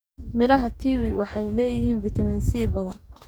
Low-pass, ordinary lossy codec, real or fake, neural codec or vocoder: none; none; fake; codec, 44.1 kHz, 2.6 kbps, DAC